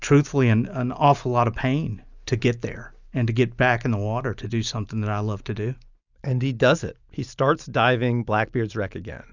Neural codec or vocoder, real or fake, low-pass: none; real; 7.2 kHz